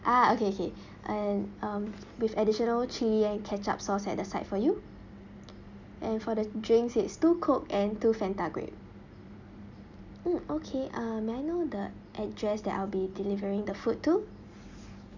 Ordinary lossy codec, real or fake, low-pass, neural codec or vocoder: none; real; 7.2 kHz; none